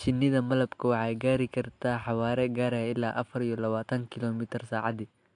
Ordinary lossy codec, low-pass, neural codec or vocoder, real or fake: AAC, 64 kbps; 9.9 kHz; none; real